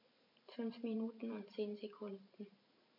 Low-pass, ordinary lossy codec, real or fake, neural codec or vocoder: 5.4 kHz; MP3, 32 kbps; fake; vocoder, 44.1 kHz, 128 mel bands every 512 samples, BigVGAN v2